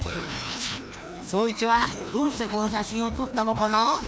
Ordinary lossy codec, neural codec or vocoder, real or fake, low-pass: none; codec, 16 kHz, 1 kbps, FreqCodec, larger model; fake; none